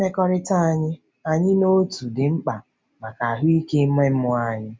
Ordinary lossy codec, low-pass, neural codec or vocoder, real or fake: none; none; none; real